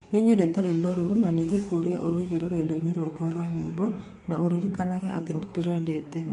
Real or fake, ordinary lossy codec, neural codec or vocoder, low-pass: fake; none; codec, 24 kHz, 1 kbps, SNAC; 10.8 kHz